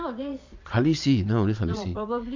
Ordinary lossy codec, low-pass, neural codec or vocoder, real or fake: MP3, 64 kbps; 7.2 kHz; vocoder, 22.05 kHz, 80 mel bands, Vocos; fake